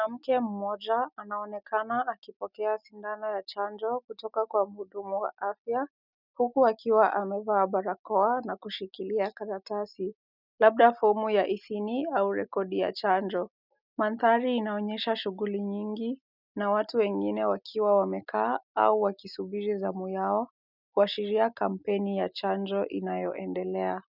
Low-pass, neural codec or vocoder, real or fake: 5.4 kHz; none; real